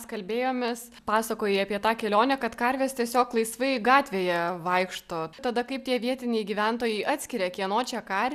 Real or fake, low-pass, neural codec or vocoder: real; 14.4 kHz; none